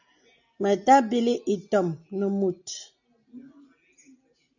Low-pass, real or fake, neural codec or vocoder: 7.2 kHz; real; none